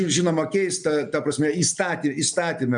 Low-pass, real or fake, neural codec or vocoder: 9.9 kHz; real; none